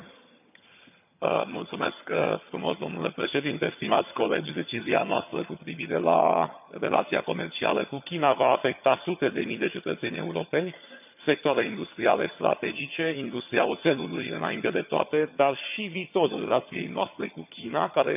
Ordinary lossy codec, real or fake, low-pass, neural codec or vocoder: none; fake; 3.6 kHz; vocoder, 22.05 kHz, 80 mel bands, HiFi-GAN